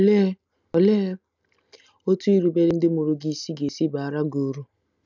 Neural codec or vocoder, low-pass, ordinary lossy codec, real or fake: none; 7.2 kHz; none; real